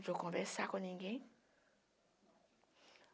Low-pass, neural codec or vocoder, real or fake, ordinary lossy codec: none; none; real; none